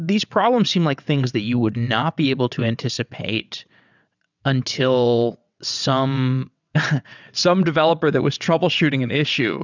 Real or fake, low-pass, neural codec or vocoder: fake; 7.2 kHz; vocoder, 22.05 kHz, 80 mel bands, WaveNeXt